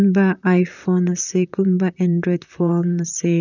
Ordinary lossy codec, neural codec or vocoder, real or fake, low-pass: none; vocoder, 44.1 kHz, 128 mel bands, Pupu-Vocoder; fake; 7.2 kHz